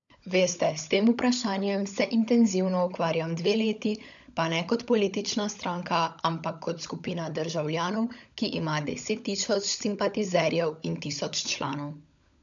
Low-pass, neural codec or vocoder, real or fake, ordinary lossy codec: 7.2 kHz; codec, 16 kHz, 16 kbps, FunCodec, trained on LibriTTS, 50 frames a second; fake; none